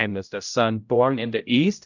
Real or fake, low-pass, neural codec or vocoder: fake; 7.2 kHz; codec, 16 kHz, 0.5 kbps, X-Codec, HuBERT features, trained on general audio